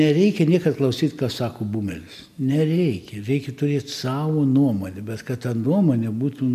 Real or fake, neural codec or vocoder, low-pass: real; none; 14.4 kHz